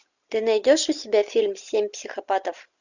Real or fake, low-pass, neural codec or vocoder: real; 7.2 kHz; none